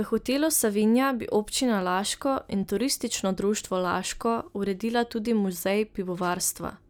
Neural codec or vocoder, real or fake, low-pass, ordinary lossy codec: none; real; none; none